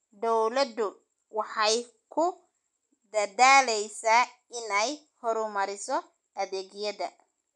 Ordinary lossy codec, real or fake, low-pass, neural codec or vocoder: none; real; 10.8 kHz; none